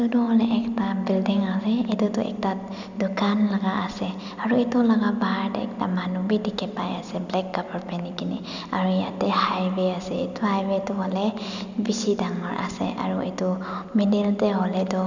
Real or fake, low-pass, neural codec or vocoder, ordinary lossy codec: real; 7.2 kHz; none; none